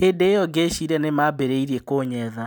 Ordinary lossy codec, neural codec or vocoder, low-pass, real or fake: none; none; none; real